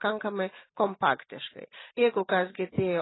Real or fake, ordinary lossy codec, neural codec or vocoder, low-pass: real; AAC, 16 kbps; none; 7.2 kHz